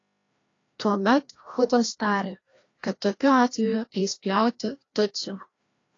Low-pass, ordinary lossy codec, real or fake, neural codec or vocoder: 7.2 kHz; AAC, 32 kbps; fake; codec, 16 kHz, 1 kbps, FreqCodec, larger model